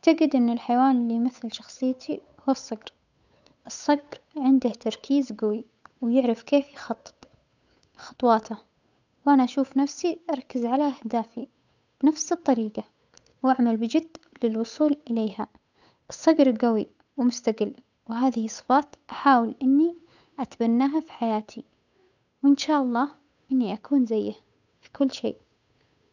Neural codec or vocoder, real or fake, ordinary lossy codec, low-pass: codec, 16 kHz, 8 kbps, FunCodec, trained on Chinese and English, 25 frames a second; fake; none; 7.2 kHz